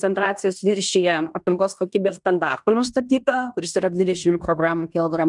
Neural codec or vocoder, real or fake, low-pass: codec, 16 kHz in and 24 kHz out, 0.9 kbps, LongCat-Audio-Codec, fine tuned four codebook decoder; fake; 10.8 kHz